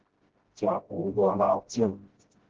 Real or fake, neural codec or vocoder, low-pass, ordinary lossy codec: fake; codec, 16 kHz, 0.5 kbps, FreqCodec, smaller model; 7.2 kHz; Opus, 16 kbps